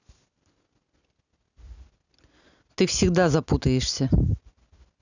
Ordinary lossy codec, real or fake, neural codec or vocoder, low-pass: none; real; none; 7.2 kHz